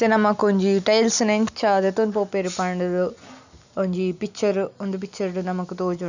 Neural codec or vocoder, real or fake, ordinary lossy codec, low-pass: none; real; none; 7.2 kHz